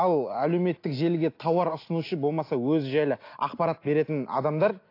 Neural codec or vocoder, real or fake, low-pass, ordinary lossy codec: none; real; 5.4 kHz; AAC, 32 kbps